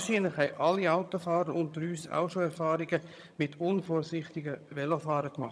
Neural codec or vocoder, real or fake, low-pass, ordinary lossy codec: vocoder, 22.05 kHz, 80 mel bands, HiFi-GAN; fake; none; none